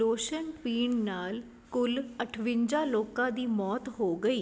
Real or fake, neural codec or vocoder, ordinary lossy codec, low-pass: real; none; none; none